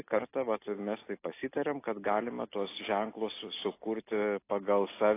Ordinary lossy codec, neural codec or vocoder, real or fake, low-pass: AAC, 24 kbps; none; real; 3.6 kHz